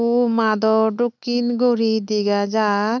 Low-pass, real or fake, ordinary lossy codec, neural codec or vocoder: 7.2 kHz; real; none; none